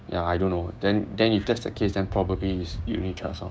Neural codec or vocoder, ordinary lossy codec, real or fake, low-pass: codec, 16 kHz, 6 kbps, DAC; none; fake; none